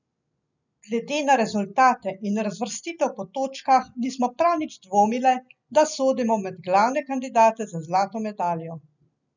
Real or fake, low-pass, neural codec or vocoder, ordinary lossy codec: real; 7.2 kHz; none; none